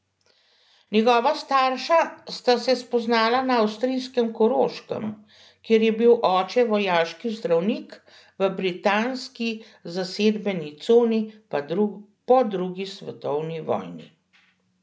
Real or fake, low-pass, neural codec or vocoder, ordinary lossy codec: real; none; none; none